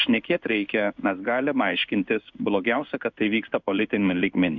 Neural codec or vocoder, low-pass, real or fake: codec, 16 kHz in and 24 kHz out, 1 kbps, XY-Tokenizer; 7.2 kHz; fake